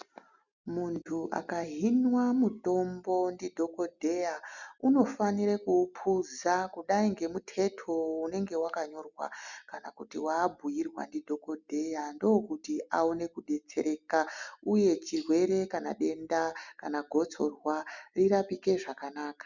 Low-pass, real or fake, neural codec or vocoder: 7.2 kHz; real; none